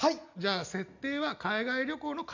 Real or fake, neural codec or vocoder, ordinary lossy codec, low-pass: real; none; none; 7.2 kHz